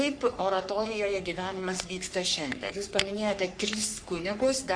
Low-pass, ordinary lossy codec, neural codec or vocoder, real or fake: 9.9 kHz; Opus, 64 kbps; codec, 44.1 kHz, 3.4 kbps, Pupu-Codec; fake